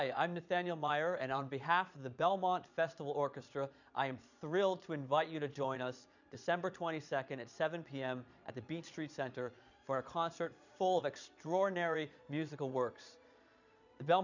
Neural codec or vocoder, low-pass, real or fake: vocoder, 22.05 kHz, 80 mel bands, Vocos; 7.2 kHz; fake